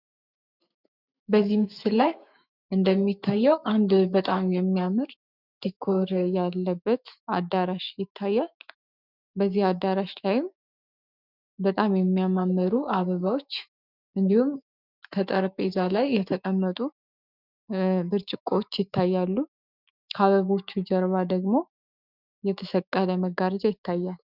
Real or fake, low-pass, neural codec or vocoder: fake; 5.4 kHz; autoencoder, 48 kHz, 128 numbers a frame, DAC-VAE, trained on Japanese speech